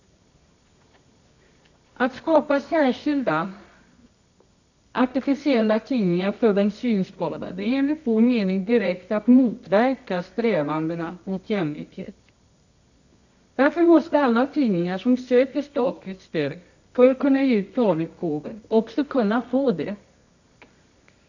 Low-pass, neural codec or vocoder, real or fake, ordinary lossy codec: 7.2 kHz; codec, 24 kHz, 0.9 kbps, WavTokenizer, medium music audio release; fake; none